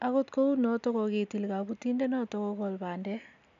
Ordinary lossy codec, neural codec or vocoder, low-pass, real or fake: none; none; 7.2 kHz; real